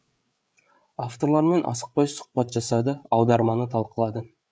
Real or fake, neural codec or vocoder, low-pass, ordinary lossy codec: fake; codec, 16 kHz, 8 kbps, FreqCodec, larger model; none; none